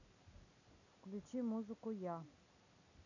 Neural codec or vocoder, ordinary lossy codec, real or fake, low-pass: none; none; real; 7.2 kHz